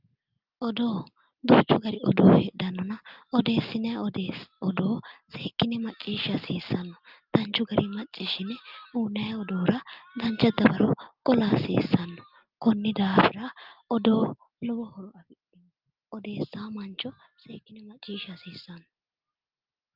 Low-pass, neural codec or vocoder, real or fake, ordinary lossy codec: 5.4 kHz; none; real; Opus, 32 kbps